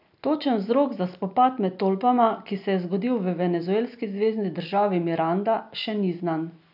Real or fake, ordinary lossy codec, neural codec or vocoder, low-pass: real; none; none; 5.4 kHz